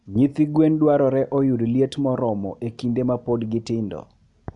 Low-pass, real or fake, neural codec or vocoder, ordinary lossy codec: 10.8 kHz; real; none; none